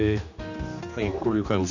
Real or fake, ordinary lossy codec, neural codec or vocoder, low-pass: fake; none; codec, 16 kHz, 2 kbps, X-Codec, HuBERT features, trained on general audio; 7.2 kHz